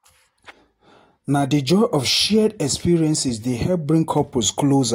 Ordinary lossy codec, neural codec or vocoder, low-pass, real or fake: AAC, 48 kbps; none; 19.8 kHz; real